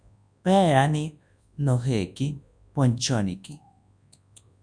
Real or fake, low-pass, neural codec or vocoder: fake; 9.9 kHz; codec, 24 kHz, 0.9 kbps, WavTokenizer, large speech release